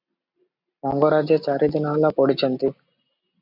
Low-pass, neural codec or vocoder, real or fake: 5.4 kHz; none; real